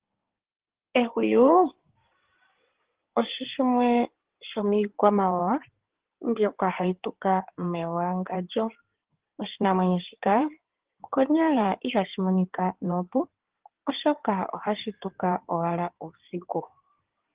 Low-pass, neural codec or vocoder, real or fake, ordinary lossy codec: 3.6 kHz; codec, 16 kHz in and 24 kHz out, 2.2 kbps, FireRedTTS-2 codec; fake; Opus, 16 kbps